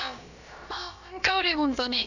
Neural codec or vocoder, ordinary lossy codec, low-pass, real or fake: codec, 16 kHz, about 1 kbps, DyCAST, with the encoder's durations; none; 7.2 kHz; fake